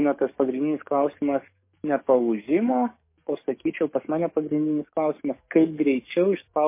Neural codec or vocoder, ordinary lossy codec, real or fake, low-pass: codec, 44.1 kHz, 7.8 kbps, DAC; MP3, 24 kbps; fake; 3.6 kHz